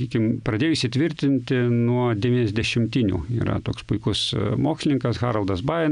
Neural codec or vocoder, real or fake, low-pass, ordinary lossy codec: none; real; 9.9 kHz; MP3, 96 kbps